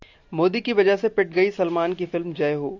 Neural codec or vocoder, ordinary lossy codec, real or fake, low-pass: none; AAC, 48 kbps; real; 7.2 kHz